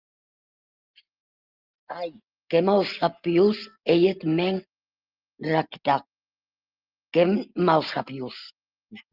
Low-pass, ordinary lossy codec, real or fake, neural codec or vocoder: 5.4 kHz; Opus, 24 kbps; real; none